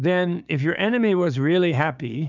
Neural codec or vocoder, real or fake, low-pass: codec, 16 kHz, 6 kbps, DAC; fake; 7.2 kHz